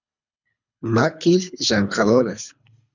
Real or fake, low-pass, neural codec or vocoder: fake; 7.2 kHz; codec, 24 kHz, 3 kbps, HILCodec